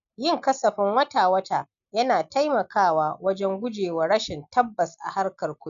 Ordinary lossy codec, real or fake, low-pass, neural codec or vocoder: none; real; 7.2 kHz; none